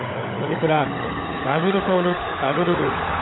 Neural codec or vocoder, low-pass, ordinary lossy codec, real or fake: codec, 16 kHz, 16 kbps, FunCodec, trained on LibriTTS, 50 frames a second; 7.2 kHz; AAC, 16 kbps; fake